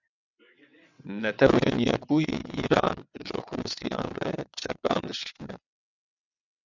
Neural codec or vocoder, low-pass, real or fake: vocoder, 22.05 kHz, 80 mel bands, WaveNeXt; 7.2 kHz; fake